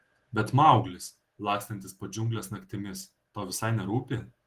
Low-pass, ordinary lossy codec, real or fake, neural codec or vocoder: 14.4 kHz; Opus, 16 kbps; real; none